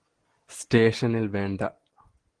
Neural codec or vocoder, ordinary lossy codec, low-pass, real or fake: none; Opus, 16 kbps; 9.9 kHz; real